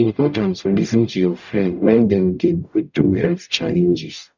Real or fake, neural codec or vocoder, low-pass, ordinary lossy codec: fake; codec, 44.1 kHz, 0.9 kbps, DAC; 7.2 kHz; none